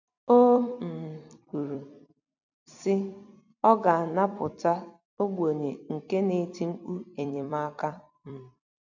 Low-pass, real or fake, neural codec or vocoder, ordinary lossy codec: 7.2 kHz; fake; vocoder, 44.1 kHz, 128 mel bands every 256 samples, BigVGAN v2; none